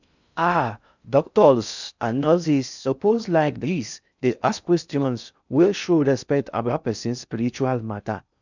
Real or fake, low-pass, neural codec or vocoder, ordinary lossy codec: fake; 7.2 kHz; codec, 16 kHz in and 24 kHz out, 0.6 kbps, FocalCodec, streaming, 4096 codes; none